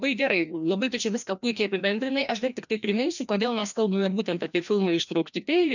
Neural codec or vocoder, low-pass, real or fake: codec, 16 kHz, 1 kbps, FreqCodec, larger model; 7.2 kHz; fake